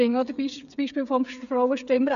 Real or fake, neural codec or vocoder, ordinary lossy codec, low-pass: fake; codec, 16 kHz, 8 kbps, FreqCodec, smaller model; none; 7.2 kHz